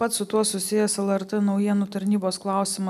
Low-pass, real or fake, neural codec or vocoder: 14.4 kHz; real; none